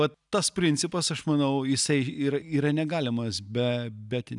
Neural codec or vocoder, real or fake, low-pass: none; real; 10.8 kHz